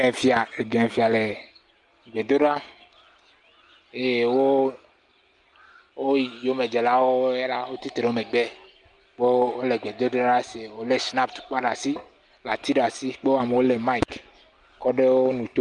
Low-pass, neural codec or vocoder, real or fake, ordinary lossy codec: 10.8 kHz; none; real; Opus, 32 kbps